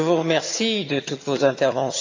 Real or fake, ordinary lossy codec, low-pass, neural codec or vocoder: fake; AAC, 48 kbps; 7.2 kHz; vocoder, 22.05 kHz, 80 mel bands, HiFi-GAN